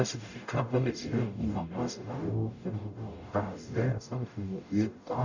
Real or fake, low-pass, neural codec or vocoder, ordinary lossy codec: fake; 7.2 kHz; codec, 44.1 kHz, 0.9 kbps, DAC; none